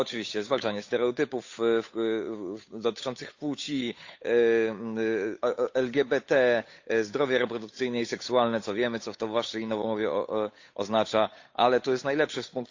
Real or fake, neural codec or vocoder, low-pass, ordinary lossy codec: fake; codec, 16 kHz, 8 kbps, FunCodec, trained on Chinese and English, 25 frames a second; 7.2 kHz; AAC, 48 kbps